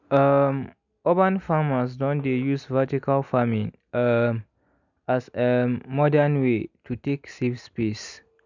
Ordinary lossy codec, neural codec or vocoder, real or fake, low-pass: none; none; real; 7.2 kHz